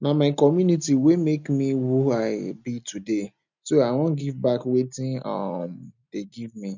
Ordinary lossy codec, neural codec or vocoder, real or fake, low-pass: none; none; real; 7.2 kHz